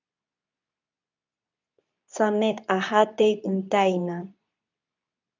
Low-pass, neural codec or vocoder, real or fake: 7.2 kHz; codec, 24 kHz, 0.9 kbps, WavTokenizer, medium speech release version 2; fake